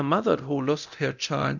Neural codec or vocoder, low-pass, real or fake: codec, 24 kHz, 0.9 kbps, DualCodec; 7.2 kHz; fake